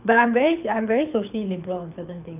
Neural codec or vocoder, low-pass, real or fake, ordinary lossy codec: codec, 24 kHz, 6 kbps, HILCodec; 3.6 kHz; fake; none